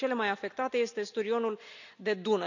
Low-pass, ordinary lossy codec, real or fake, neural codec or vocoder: 7.2 kHz; none; real; none